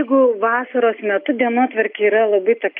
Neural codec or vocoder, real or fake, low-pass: none; real; 5.4 kHz